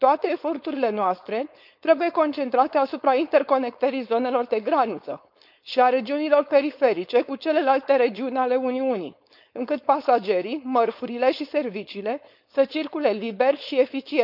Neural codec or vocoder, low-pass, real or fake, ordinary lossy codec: codec, 16 kHz, 4.8 kbps, FACodec; 5.4 kHz; fake; none